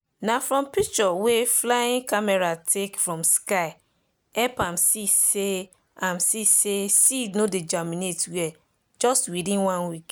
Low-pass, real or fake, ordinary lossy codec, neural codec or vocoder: none; real; none; none